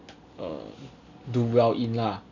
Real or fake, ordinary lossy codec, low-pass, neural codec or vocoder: real; none; 7.2 kHz; none